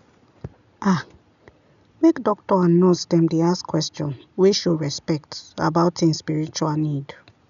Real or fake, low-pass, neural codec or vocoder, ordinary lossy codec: real; 7.2 kHz; none; none